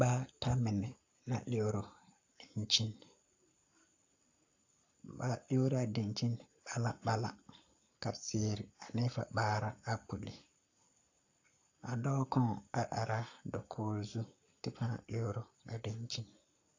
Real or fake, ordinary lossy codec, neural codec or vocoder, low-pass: fake; AAC, 48 kbps; codec, 44.1 kHz, 7.8 kbps, Pupu-Codec; 7.2 kHz